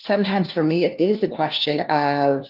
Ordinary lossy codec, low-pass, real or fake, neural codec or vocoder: Opus, 32 kbps; 5.4 kHz; fake; codec, 16 kHz, 1 kbps, FunCodec, trained on LibriTTS, 50 frames a second